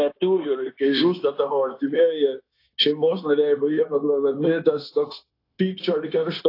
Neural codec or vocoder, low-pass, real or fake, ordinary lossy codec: codec, 16 kHz, 0.9 kbps, LongCat-Audio-Codec; 5.4 kHz; fake; AAC, 32 kbps